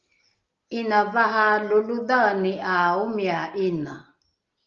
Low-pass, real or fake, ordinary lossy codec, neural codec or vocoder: 7.2 kHz; real; Opus, 32 kbps; none